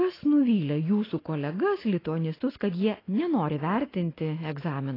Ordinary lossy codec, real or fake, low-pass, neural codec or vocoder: AAC, 24 kbps; real; 5.4 kHz; none